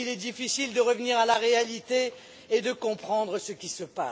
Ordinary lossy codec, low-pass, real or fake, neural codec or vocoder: none; none; real; none